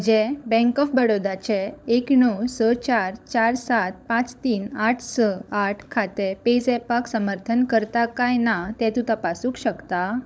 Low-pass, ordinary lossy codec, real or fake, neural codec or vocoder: none; none; fake; codec, 16 kHz, 16 kbps, FunCodec, trained on LibriTTS, 50 frames a second